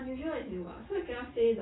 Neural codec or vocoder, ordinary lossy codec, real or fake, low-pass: none; AAC, 16 kbps; real; 7.2 kHz